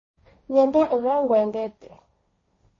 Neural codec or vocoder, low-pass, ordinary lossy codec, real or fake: codec, 16 kHz, 1.1 kbps, Voila-Tokenizer; 7.2 kHz; MP3, 32 kbps; fake